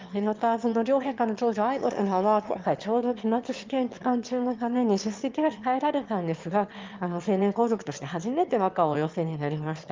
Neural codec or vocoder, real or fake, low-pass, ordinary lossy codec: autoencoder, 22.05 kHz, a latent of 192 numbers a frame, VITS, trained on one speaker; fake; 7.2 kHz; Opus, 32 kbps